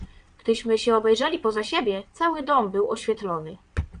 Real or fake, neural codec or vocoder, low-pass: fake; vocoder, 22.05 kHz, 80 mel bands, WaveNeXt; 9.9 kHz